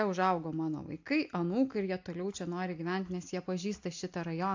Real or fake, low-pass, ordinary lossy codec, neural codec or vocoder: real; 7.2 kHz; AAC, 48 kbps; none